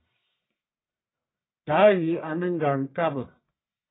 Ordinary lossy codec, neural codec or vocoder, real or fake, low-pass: AAC, 16 kbps; codec, 44.1 kHz, 1.7 kbps, Pupu-Codec; fake; 7.2 kHz